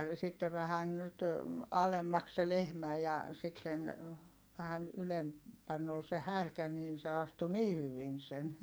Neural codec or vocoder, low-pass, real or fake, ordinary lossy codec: codec, 44.1 kHz, 2.6 kbps, SNAC; none; fake; none